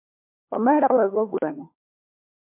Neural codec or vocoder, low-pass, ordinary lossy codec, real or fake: codec, 16 kHz, 4 kbps, FunCodec, trained on LibriTTS, 50 frames a second; 3.6 kHz; MP3, 24 kbps; fake